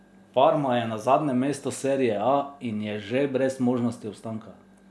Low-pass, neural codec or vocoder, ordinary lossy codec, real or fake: none; none; none; real